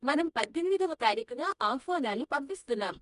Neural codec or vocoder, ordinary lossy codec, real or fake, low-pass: codec, 24 kHz, 0.9 kbps, WavTokenizer, medium music audio release; none; fake; 10.8 kHz